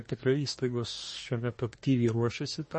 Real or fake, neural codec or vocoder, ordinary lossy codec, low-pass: fake; codec, 24 kHz, 1 kbps, SNAC; MP3, 32 kbps; 10.8 kHz